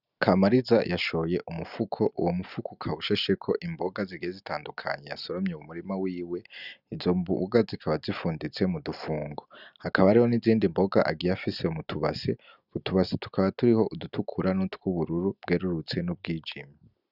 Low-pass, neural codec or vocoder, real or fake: 5.4 kHz; none; real